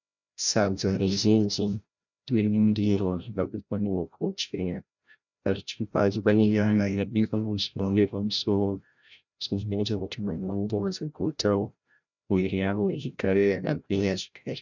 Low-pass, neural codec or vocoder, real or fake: 7.2 kHz; codec, 16 kHz, 0.5 kbps, FreqCodec, larger model; fake